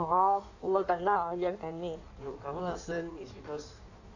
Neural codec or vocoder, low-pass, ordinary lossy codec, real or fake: codec, 16 kHz in and 24 kHz out, 1.1 kbps, FireRedTTS-2 codec; 7.2 kHz; none; fake